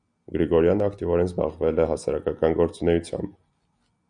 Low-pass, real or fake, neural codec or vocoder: 9.9 kHz; real; none